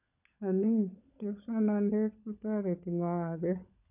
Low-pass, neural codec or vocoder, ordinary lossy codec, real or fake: 3.6 kHz; codec, 16 kHz, 16 kbps, FunCodec, trained on LibriTTS, 50 frames a second; none; fake